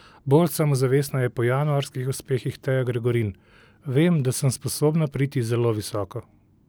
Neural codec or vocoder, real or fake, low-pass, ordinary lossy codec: none; real; none; none